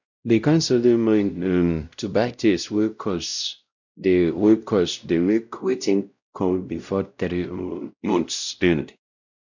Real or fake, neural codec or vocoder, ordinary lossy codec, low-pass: fake; codec, 16 kHz, 0.5 kbps, X-Codec, WavLM features, trained on Multilingual LibriSpeech; none; 7.2 kHz